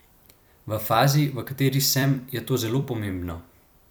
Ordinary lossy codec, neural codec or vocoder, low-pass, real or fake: none; vocoder, 44.1 kHz, 128 mel bands every 256 samples, BigVGAN v2; none; fake